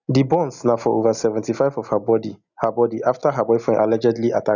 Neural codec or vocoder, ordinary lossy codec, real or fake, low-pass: none; none; real; 7.2 kHz